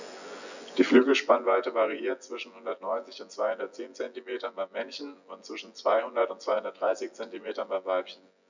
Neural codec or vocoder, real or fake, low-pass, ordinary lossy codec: vocoder, 24 kHz, 100 mel bands, Vocos; fake; 7.2 kHz; none